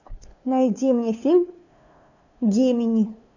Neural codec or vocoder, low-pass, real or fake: codec, 16 kHz, 2 kbps, FunCodec, trained on LibriTTS, 25 frames a second; 7.2 kHz; fake